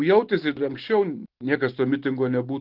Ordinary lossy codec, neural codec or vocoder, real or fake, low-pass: Opus, 16 kbps; none; real; 5.4 kHz